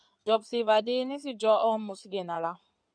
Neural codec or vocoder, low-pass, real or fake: codec, 16 kHz in and 24 kHz out, 2.2 kbps, FireRedTTS-2 codec; 9.9 kHz; fake